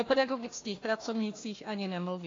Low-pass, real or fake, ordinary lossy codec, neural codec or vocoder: 7.2 kHz; fake; AAC, 32 kbps; codec, 16 kHz, 1 kbps, FunCodec, trained on Chinese and English, 50 frames a second